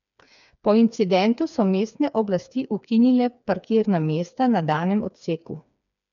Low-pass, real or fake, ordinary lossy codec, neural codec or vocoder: 7.2 kHz; fake; none; codec, 16 kHz, 4 kbps, FreqCodec, smaller model